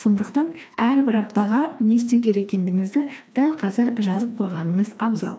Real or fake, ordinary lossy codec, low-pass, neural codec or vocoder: fake; none; none; codec, 16 kHz, 1 kbps, FreqCodec, larger model